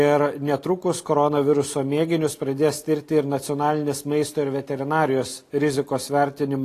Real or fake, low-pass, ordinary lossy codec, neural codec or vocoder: real; 14.4 kHz; AAC, 48 kbps; none